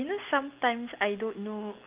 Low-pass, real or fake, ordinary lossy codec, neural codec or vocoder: 3.6 kHz; real; Opus, 32 kbps; none